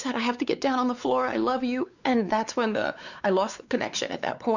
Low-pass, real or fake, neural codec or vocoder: 7.2 kHz; fake; codec, 16 kHz, 4 kbps, X-Codec, WavLM features, trained on Multilingual LibriSpeech